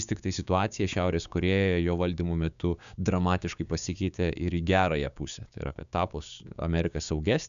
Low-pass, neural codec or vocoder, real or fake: 7.2 kHz; codec, 16 kHz, 6 kbps, DAC; fake